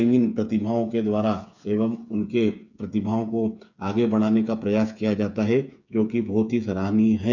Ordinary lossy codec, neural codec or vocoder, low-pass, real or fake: none; codec, 16 kHz, 8 kbps, FreqCodec, smaller model; 7.2 kHz; fake